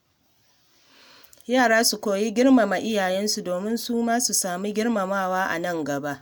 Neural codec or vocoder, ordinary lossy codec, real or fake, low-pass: none; none; real; none